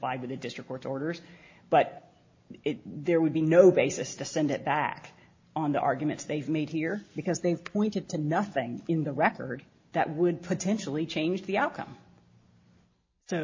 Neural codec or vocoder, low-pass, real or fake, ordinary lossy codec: none; 7.2 kHz; real; MP3, 32 kbps